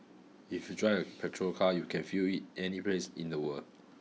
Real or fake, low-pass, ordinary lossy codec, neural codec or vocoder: real; none; none; none